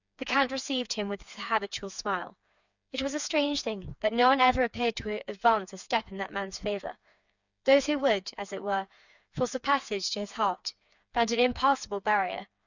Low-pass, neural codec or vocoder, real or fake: 7.2 kHz; codec, 16 kHz, 4 kbps, FreqCodec, smaller model; fake